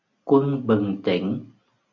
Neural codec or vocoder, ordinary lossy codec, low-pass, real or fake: none; AAC, 48 kbps; 7.2 kHz; real